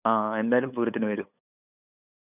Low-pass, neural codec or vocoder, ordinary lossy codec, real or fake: 3.6 kHz; codec, 16 kHz, 8 kbps, FunCodec, trained on LibriTTS, 25 frames a second; none; fake